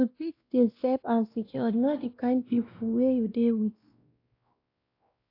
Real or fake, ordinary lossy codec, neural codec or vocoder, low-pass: fake; AAC, 32 kbps; codec, 16 kHz, 1 kbps, X-Codec, WavLM features, trained on Multilingual LibriSpeech; 5.4 kHz